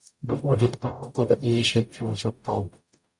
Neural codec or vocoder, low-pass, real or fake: codec, 44.1 kHz, 0.9 kbps, DAC; 10.8 kHz; fake